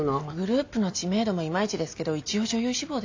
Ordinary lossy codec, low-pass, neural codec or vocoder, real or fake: AAC, 48 kbps; 7.2 kHz; none; real